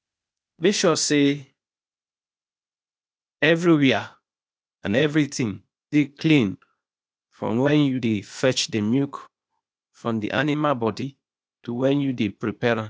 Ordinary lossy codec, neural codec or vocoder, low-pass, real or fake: none; codec, 16 kHz, 0.8 kbps, ZipCodec; none; fake